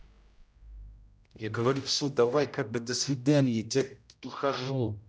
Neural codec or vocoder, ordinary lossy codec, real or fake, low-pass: codec, 16 kHz, 0.5 kbps, X-Codec, HuBERT features, trained on general audio; none; fake; none